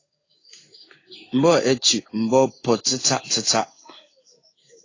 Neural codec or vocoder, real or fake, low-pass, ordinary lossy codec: codec, 16 kHz in and 24 kHz out, 1 kbps, XY-Tokenizer; fake; 7.2 kHz; AAC, 32 kbps